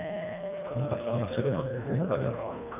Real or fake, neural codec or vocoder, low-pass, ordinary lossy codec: fake; codec, 24 kHz, 1.5 kbps, HILCodec; 3.6 kHz; none